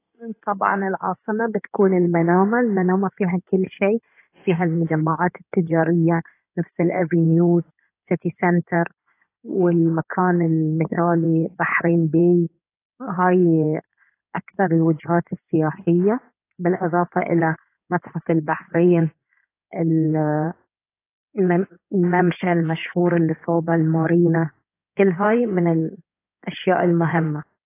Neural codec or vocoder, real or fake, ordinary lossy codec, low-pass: codec, 16 kHz in and 24 kHz out, 2.2 kbps, FireRedTTS-2 codec; fake; AAC, 24 kbps; 3.6 kHz